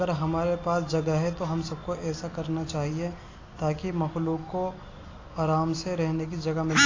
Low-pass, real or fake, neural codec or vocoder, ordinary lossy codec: 7.2 kHz; real; none; AAC, 32 kbps